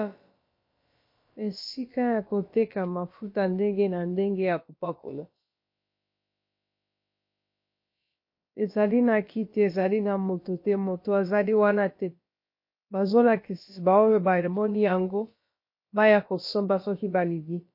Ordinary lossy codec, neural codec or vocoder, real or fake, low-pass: MP3, 32 kbps; codec, 16 kHz, about 1 kbps, DyCAST, with the encoder's durations; fake; 5.4 kHz